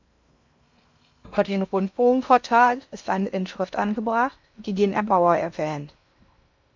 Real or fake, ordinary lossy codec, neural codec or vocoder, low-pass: fake; MP3, 64 kbps; codec, 16 kHz in and 24 kHz out, 0.6 kbps, FocalCodec, streaming, 2048 codes; 7.2 kHz